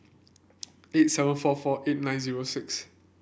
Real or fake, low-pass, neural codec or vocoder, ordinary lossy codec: real; none; none; none